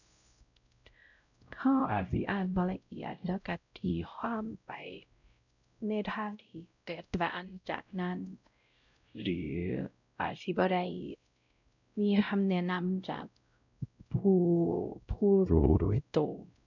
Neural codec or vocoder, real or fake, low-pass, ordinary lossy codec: codec, 16 kHz, 0.5 kbps, X-Codec, WavLM features, trained on Multilingual LibriSpeech; fake; 7.2 kHz; none